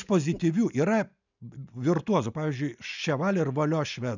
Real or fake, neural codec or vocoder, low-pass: real; none; 7.2 kHz